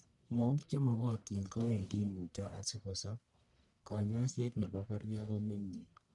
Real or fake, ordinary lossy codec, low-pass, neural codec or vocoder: fake; none; 10.8 kHz; codec, 44.1 kHz, 1.7 kbps, Pupu-Codec